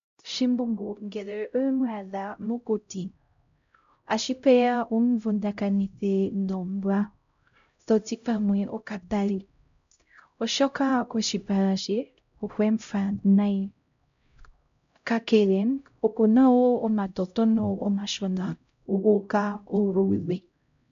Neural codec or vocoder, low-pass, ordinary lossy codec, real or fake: codec, 16 kHz, 0.5 kbps, X-Codec, HuBERT features, trained on LibriSpeech; 7.2 kHz; MP3, 64 kbps; fake